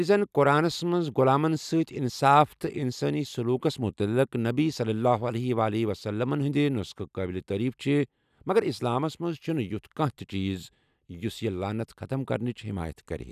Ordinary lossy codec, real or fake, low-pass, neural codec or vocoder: none; real; 14.4 kHz; none